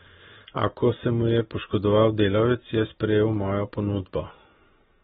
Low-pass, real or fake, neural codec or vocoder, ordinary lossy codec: 19.8 kHz; real; none; AAC, 16 kbps